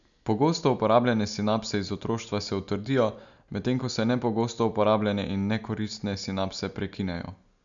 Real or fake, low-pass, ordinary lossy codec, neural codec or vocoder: real; 7.2 kHz; none; none